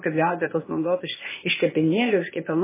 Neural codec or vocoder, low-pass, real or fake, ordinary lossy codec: codec, 16 kHz, 0.8 kbps, ZipCodec; 3.6 kHz; fake; MP3, 16 kbps